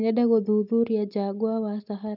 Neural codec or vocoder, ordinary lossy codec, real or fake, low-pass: none; none; real; 5.4 kHz